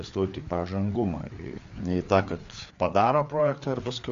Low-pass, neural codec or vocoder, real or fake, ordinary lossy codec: 7.2 kHz; codec, 16 kHz, 4 kbps, FreqCodec, larger model; fake; MP3, 48 kbps